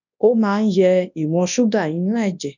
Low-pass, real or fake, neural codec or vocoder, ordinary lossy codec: 7.2 kHz; fake; codec, 24 kHz, 0.9 kbps, WavTokenizer, large speech release; none